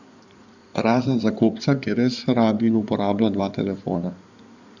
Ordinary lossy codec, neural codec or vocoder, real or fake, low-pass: none; codec, 16 kHz in and 24 kHz out, 2.2 kbps, FireRedTTS-2 codec; fake; 7.2 kHz